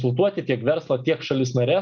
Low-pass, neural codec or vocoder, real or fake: 7.2 kHz; none; real